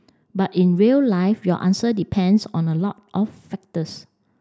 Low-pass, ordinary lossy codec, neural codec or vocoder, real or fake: none; none; none; real